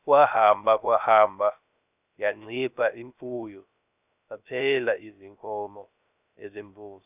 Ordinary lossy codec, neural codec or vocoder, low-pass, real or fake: none; codec, 16 kHz, 0.3 kbps, FocalCodec; 3.6 kHz; fake